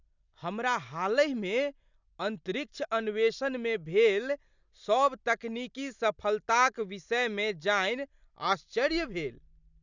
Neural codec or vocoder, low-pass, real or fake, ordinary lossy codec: none; 7.2 kHz; real; none